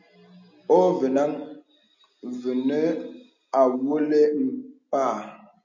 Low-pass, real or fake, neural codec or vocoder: 7.2 kHz; real; none